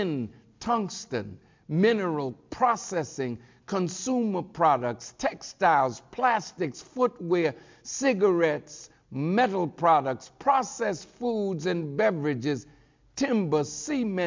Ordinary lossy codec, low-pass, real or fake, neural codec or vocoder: MP3, 64 kbps; 7.2 kHz; real; none